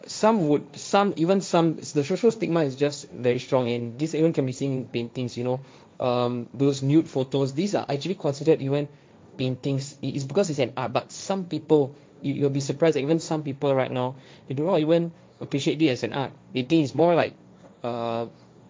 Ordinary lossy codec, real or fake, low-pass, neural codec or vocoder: none; fake; none; codec, 16 kHz, 1.1 kbps, Voila-Tokenizer